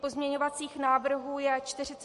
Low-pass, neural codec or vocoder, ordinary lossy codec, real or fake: 14.4 kHz; none; MP3, 48 kbps; real